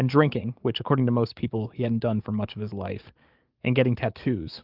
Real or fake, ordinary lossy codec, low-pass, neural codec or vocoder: fake; Opus, 24 kbps; 5.4 kHz; codec, 44.1 kHz, 7.8 kbps, DAC